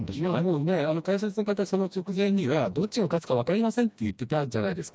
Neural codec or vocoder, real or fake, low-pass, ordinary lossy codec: codec, 16 kHz, 1 kbps, FreqCodec, smaller model; fake; none; none